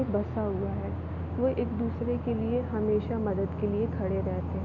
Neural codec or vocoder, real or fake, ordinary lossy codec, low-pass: none; real; none; 7.2 kHz